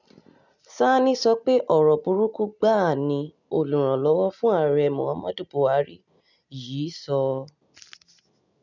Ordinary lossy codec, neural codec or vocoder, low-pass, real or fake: none; none; 7.2 kHz; real